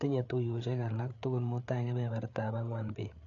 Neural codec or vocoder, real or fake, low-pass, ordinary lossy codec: codec, 16 kHz, 8 kbps, FreqCodec, larger model; fake; 7.2 kHz; none